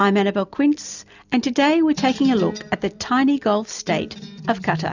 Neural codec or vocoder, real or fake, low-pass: none; real; 7.2 kHz